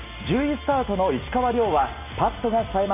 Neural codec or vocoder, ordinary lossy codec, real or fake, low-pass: none; MP3, 32 kbps; real; 3.6 kHz